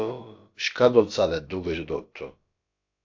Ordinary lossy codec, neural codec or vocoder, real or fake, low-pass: AAC, 48 kbps; codec, 16 kHz, about 1 kbps, DyCAST, with the encoder's durations; fake; 7.2 kHz